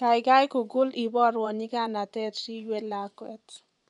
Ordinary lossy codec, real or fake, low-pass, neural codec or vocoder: none; fake; 10.8 kHz; vocoder, 24 kHz, 100 mel bands, Vocos